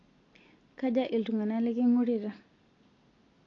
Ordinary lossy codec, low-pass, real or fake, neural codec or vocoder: AAC, 32 kbps; 7.2 kHz; real; none